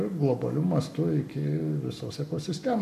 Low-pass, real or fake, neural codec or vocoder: 14.4 kHz; fake; codec, 44.1 kHz, 7.8 kbps, Pupu-Codec